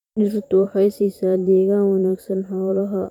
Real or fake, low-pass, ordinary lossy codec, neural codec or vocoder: fake; 19.8 kHz; none; vocoder, 44.1 kHz, 128 mel bands every 512 samples, BigVGAN v2